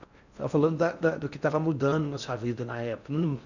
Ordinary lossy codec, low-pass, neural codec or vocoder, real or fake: none; 7.2 kHz; codec, 16 kHz in and 24 kHz out, 0.6 kbps, FocalCodec, streaming, 4096 codes; fake